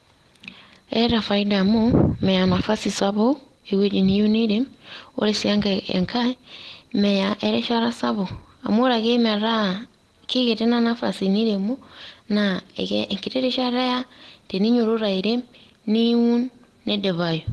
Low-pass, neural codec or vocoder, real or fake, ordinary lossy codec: 10.8 kHz; none; real; Opus, 16 kbps